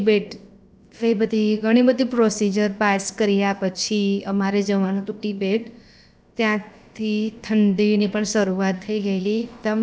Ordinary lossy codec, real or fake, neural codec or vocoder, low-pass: none; fake; codec, 16 kHz, about 1 kbps, DyCAST, with the encoder's durations; none